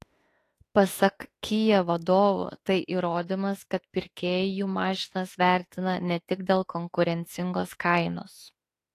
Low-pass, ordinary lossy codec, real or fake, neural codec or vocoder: 14.4 kHz; AAC, 48 kbps; fake; autoencoder, 48 kHz, 32 numbers a frame, DAC-VAE, trained on Japanese speech